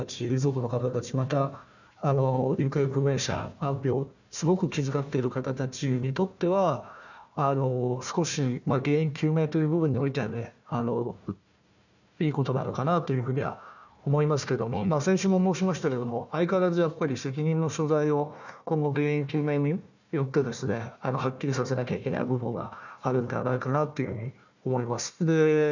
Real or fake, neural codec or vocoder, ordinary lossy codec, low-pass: fake; codec, 16 kHz, 1 kbps, FunCodec, trained on Chinese and English, 50 frames a second; none; 7.2 kHz